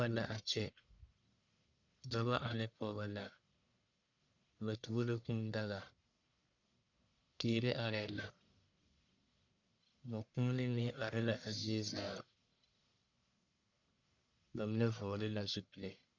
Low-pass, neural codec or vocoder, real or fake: 7.2 kHz; codec, 44.1 kHz, 1.7 kbps, Pupu-Codec; fake